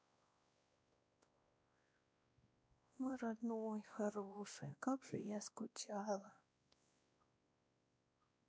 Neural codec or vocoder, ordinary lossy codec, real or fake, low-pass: codec, 16 kHz, 1 kbps, X-Codec, WavLM features, trained on Multilingual LibriSpeech; none; fake; none